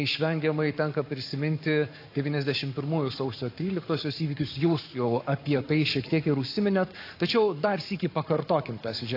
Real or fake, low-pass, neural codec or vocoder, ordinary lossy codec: fake; 5.4 kHz; codec, 44.1 kHz, 7.8 kbps, Pupu-Codec; AAC, 32 kbps